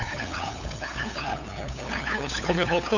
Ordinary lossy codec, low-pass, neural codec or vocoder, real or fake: none; 7.2 kHz; codec, 16 kHz, 8 kbps, FunCodec, trained on LibriTTS, 25 frames a second; fake